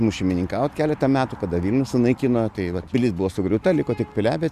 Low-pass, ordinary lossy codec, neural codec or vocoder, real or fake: 14.4 kHz; MP3, 96 kbps; none; real